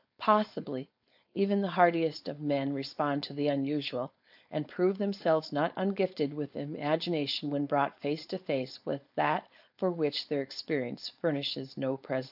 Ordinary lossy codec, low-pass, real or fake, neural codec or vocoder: AAC, 48 kbps; 5.4 kHz; fake; codec, 16 kHz, 4.8 kbps, FACodec